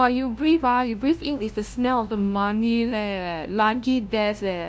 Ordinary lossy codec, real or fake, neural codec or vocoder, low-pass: none; fake; codec, 16 kHz, 0.5 kbps, FunCodec, trained on LibriTTS, 25 frames a second; none